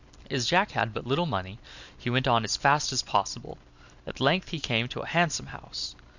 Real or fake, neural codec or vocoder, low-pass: real; none; 7.2 kHz